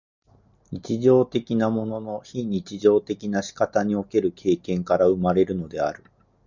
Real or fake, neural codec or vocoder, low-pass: real; none; 7.2 kHz